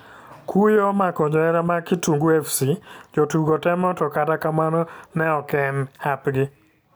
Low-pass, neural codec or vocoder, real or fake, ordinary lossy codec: none; none; real; none